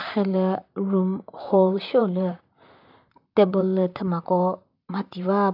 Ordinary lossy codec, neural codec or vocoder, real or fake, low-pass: AAC, 48 kbps; vocoder, 44.1 kHz, 128 mel bands, Pupu-Vocoder; fake; 5.4 kHz